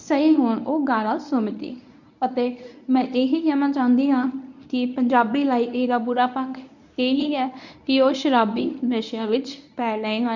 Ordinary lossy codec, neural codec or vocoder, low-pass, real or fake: none; codec, 24 kHz, 0.9 kbps, WavTokenizer, medium speech release version 1; 7.2 kHz; fake